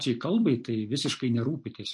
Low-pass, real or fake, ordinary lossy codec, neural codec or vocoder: 10.8 kHz; real; MP3, 48 kbps; none